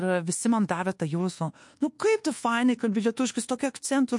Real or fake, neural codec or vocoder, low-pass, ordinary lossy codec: fake; codec, 24 kHz, 1.2 kbps, DualCodec; 10.8 kHz; MP3, 48 kbps